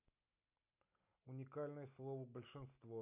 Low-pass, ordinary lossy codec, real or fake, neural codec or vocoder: 3.6 kHz; none; real; none